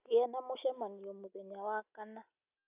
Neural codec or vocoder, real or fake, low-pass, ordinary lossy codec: none; real; 3.6 kHz; none